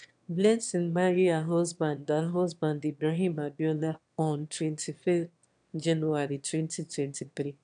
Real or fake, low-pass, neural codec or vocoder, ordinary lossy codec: fake; 9.9 kHz; autoencoder, 22.05 kHz, a latent of 192 numbers a frame, VITS, trained on one speaker; MP3, 96 kbps